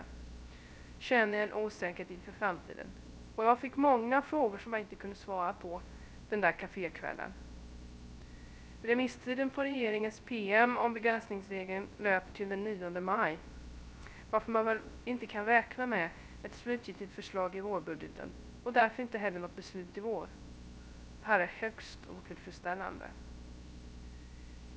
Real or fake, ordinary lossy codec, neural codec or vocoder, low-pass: fake; none; codec, 16 kHz, 0.3 kbps, FocalCodec; none